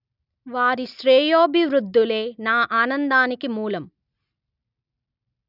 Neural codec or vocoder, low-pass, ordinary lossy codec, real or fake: none; 5.4 kHz; none; real